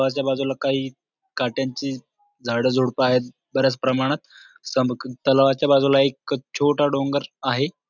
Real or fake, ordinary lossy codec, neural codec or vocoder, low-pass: real; none; none; 7.2 kHz